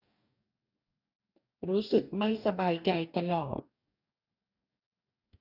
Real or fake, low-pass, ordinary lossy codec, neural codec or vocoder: fake; 5.4 kHz; none; codec, 44.1 kHz, 2.6 kbps, DAC